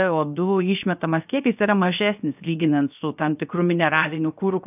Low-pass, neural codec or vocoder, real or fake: 3.6 kHz; codec, 16 kHz, 0.7 kbps, FocalCodec; fake